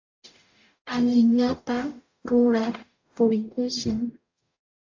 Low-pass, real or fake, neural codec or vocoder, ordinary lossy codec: 7.2 kHz; fake; codec, 44.1 kHz, 0.9 kbps, DAC; AAC, 48 kbps